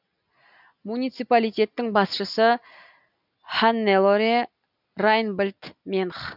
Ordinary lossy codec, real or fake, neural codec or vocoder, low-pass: none; real; none; 5.4 kHz